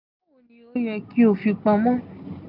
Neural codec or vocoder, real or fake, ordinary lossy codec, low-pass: none; real; none; 5.4 kHz